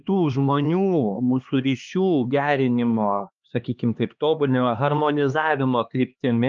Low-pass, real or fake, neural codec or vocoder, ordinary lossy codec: 7.2 kHz; fake; codec, 16 kHz, 2 kbps, X-Codec, HuBERT features, trained on LibriSpeech; Opus, 24 kbps